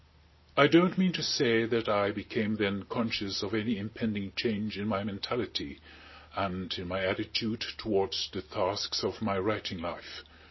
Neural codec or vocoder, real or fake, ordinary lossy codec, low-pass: none; real; MP3, 24 kbps; 7.2 kHz